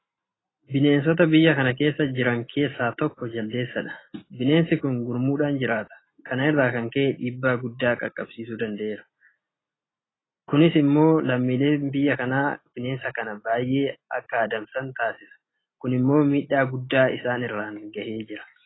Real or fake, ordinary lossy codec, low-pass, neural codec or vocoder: real; AAC, 16 kbps; 7.2 kHz; none